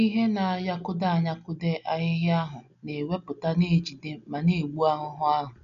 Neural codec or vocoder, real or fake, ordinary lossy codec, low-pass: none; real; none; 7.2 kHz